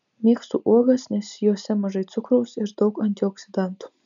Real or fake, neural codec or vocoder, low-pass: real; none; 7.2 kHz